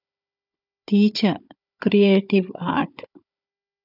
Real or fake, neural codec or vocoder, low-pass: fake; codec, 16 kHz, 16 kbps, FunCodec, trained on Chinese and English, 50 frames a second; 5.4 kHz